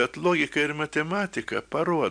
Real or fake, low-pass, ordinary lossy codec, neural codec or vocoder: real; 9.9 kHz; MP3, 96 kbps; none